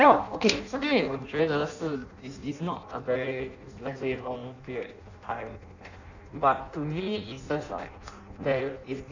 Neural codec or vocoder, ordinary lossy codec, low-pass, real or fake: codec, 16 kHz in and 24 kHz out, 0.6 kbps, FireRedTTS-2 codec; none; 7.2 kHz; fake